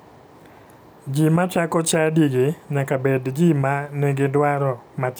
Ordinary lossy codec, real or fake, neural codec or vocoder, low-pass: none; real; none; none